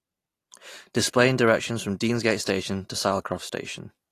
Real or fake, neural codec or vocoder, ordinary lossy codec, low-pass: real; none; AAC, 48 kbps; 14.4 kHz